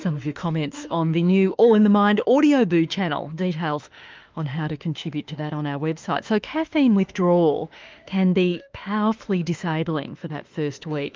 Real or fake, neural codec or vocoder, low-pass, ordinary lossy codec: fake; autoencoder, 48 kHz, 32 numbers a frame, DAC-VAE, trained on Japanese speech; 7.2 kHz; Opus, 32 kbps